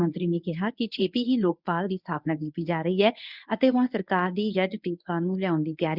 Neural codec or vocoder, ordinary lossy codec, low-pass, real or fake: codec, 24 kHz, 0.9 kbps, WavTokenizer, medium speech release version 1; none; 5.4 kHz; fake